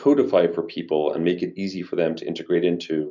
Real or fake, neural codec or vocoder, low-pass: real; none; 7.2 kHz